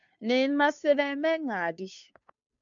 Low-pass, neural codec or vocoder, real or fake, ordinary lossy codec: 7.2 kHz; codec, 16 kHz, 2 kbps, FunCodec, trained on Chinese and English, 25 frames a second; fake; MP3, 64 kbps